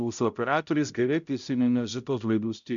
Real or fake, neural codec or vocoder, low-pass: fake; codec, 16 kHz, 0.5 kbps, X-Codec, HuBERT features, trained on balanced general audio; 7.2 kHz